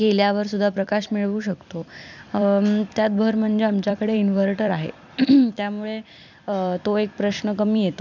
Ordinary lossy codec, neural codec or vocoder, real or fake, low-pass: none; vocoder, 44.1 kHz, 128 mel bands every 256 samples, BigVGAN v2; fake; 7.2 kHz